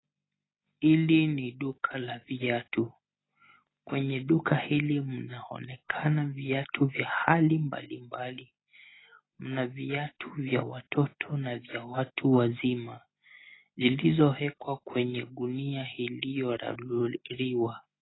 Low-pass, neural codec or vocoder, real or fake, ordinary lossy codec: 7.2 kHz; none; real; AAC, 16 kbps